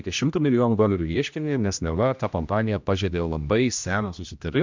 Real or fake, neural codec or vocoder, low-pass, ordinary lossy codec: fake; codec, 16 kHz, 1 kbps, X-Codec, HuBERT features, trained on general audio; 7.2 kHz; MP3, 64 kbps